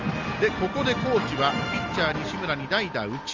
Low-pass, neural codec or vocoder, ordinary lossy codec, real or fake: 7.2 kHz; none; Opus, 32 kbps; real